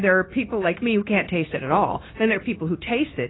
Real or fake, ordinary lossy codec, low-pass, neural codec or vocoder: fake; AAC, 16 kbps; 7.2 kHz; codec, 16 kHz, 0.9 kbps, LongCat-Audio-Codec